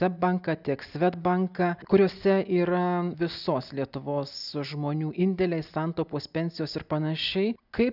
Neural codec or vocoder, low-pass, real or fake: none; 5.4 kHz; real